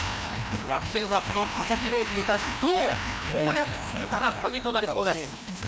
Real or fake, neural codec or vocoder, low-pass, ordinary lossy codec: fake; codec, 16 kHz, 1 kbps, FreqCodec, larger model; none; none